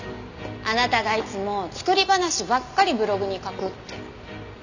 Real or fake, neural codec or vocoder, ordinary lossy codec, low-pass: real; none; none; 7.2 kHz